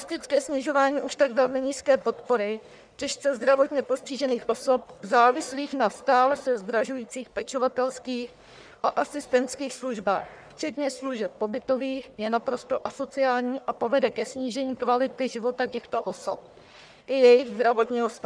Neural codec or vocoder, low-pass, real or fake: codec, 44.1 kHz, 1.7 kbps, Pupu-Codec; 9.9 kHz; fake